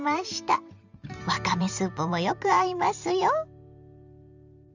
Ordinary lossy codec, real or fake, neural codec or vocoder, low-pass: none; fake; vocoder, 44.1 kHz, 128 mel bands every 512 samples, BigVGAN v2; 7.2 kHz